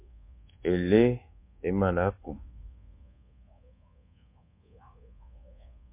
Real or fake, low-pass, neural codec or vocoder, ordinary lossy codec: fake; 3.6 kHz; codec, 24 kHz, 1.2 kbps, DualCodec; MP3, 24 kbps